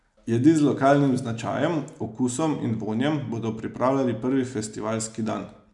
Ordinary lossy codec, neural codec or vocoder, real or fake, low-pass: none; none; real; 10.8 kHz